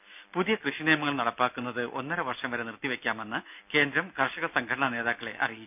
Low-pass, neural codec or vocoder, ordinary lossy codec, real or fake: 3.6 kHz; none; none; real